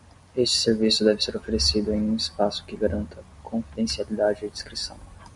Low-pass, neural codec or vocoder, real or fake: 10.8 kHz; none; real